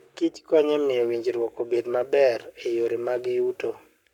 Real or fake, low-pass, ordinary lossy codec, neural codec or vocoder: fake; 19.8 kHz; none; codec, 44.1 kHz, 7.8 kbps, Pupu-Codec